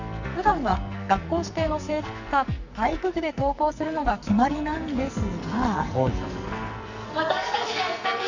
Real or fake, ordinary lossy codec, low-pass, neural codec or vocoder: fake; none; 7.2 kHz; codec, 44.1 kHz, 2.6 kbps, SNAC